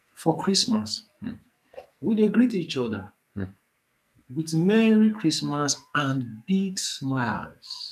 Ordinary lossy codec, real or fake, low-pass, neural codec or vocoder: none; fake; 14.4 kHz; codec, 44.1 kHz, 2.6 kbps, SNAC